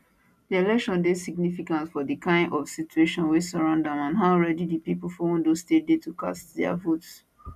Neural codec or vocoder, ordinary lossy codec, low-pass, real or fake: none; none; 14.4 kHz; real